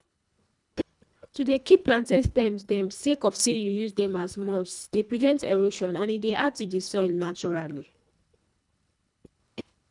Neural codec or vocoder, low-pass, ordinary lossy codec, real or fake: codec, 24 kHz, 1.5 kbps, HILCodec; 10.8 kHz; none; fake